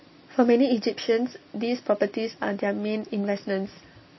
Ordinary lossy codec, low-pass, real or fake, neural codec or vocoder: MP3, 24 kbps; 7.2 kHz; real; none